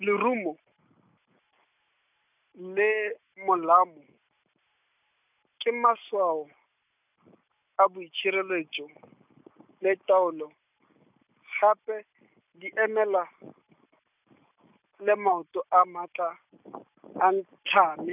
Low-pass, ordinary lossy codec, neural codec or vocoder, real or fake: 3.6 kHz; none; none; real